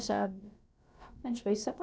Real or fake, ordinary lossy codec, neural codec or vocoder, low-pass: fake; none; codec, 16 kHz, about 1 kbps, DyCAST, with the encoder's durations; none